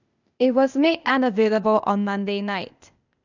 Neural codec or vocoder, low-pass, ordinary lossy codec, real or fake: codec, 16 kHz, 0.8 kbps, ZipCodec; 7.2 kHz; none; fake